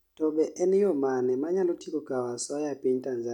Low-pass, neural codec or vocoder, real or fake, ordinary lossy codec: 19.8 kHz; none; real; none